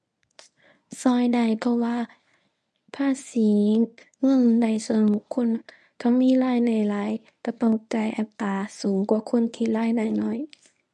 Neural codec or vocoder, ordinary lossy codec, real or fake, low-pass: codec, 24 kHz, 0.9 kbps, WavTokenizer, medium speech release version 1; none; fake; none